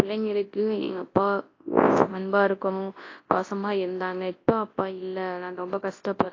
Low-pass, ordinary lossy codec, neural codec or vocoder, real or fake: 7.2 kHz; AAC, 32 kbps; codec, 24 kHz, 0.9 kbps, WavTokenizer, large speech release; fake